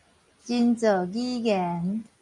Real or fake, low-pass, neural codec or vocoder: real; 10.8 kHz; none